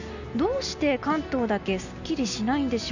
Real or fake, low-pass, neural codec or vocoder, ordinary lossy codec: real; 7.2 kHz; none; none